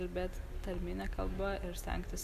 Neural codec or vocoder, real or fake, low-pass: none; real; 14.4 kHz